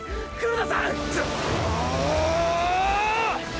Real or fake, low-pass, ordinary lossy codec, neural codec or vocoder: real; none; none; none